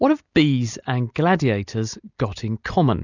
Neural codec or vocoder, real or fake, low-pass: none; real; 7.2 kHz